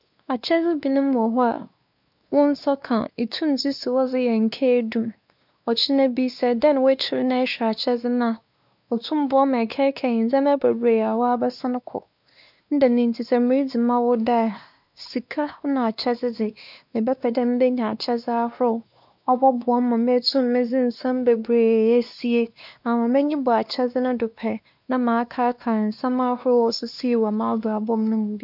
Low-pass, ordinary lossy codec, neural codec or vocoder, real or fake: 5.4 kHz; none; codec, 16 kHz, 2 kbps, X-Codec, WavLM features, trained on Multilingual LibriSpeech; fake